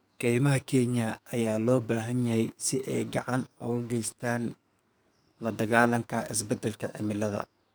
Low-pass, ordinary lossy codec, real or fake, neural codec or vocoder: none; none; fake; codec, 44.1 kHz, 2.6 kbps, SNAC